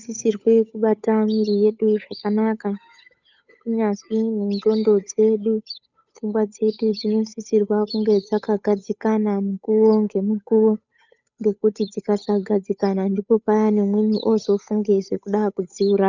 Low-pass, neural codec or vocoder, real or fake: 7.2 kHz; codec, 16 kHz, 8 kbps, FunCodec, trained on Chinese and English, 25 frames a second; fake